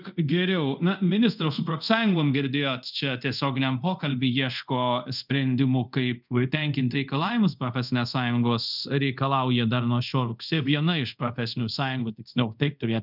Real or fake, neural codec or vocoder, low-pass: fake; codec, 24 kHz, 0.5 kbps, DualCodec; 5.4 kHz